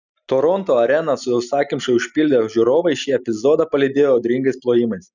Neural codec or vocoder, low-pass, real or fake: none; 7.2 kHz; real